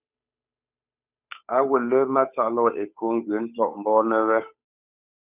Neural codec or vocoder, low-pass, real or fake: codec, 16 kHz, 8 kbps, FunCodec, trained on Chinese and English, 25 frames a second; 3.6 kHz; fake